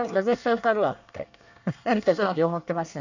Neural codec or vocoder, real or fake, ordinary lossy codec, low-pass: codec, 24 kHz, 1 kbps, SNAC; fake; none; 7.2 kHz